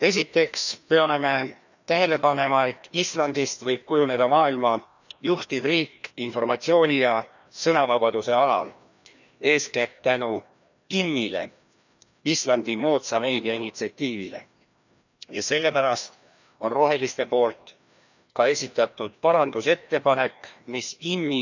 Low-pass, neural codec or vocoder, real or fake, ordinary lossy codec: 7.2 kHz; codec, 16 kHz, 1 kbps, FreqCodec, larger model; fake; none